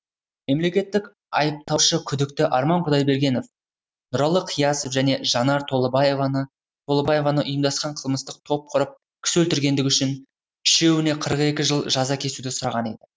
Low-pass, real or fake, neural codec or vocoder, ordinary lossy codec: none; real; none; none